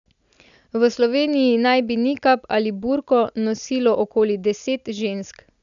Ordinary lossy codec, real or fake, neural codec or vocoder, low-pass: none; real; none; 7.2 kHz